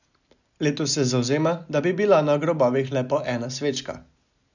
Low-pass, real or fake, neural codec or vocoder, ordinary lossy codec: 7.2 kHz; real; none; none